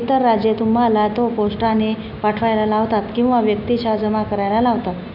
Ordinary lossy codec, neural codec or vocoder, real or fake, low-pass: none; none; real; 5.4 kHz